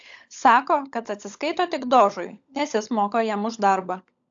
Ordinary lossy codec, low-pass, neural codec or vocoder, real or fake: AAC, 64 kbps; 7.2 kHz; codec, 16 kHz, 16 kbps, FunCodec, trained on LibriTTS, 50 frames a second; fake